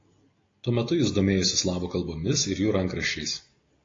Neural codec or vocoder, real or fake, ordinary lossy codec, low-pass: none; real; AAC, 32 kbps; 7.2 kHz